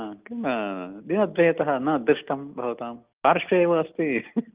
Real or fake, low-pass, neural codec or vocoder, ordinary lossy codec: real; 3.6 kHz; none; Opus, 32 kbps